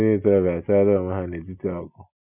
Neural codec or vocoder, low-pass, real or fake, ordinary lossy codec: none; 3.6 kHz; real; none